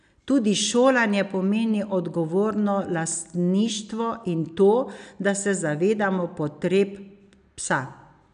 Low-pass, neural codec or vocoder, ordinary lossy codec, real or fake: 9.9 kHz; none; none; real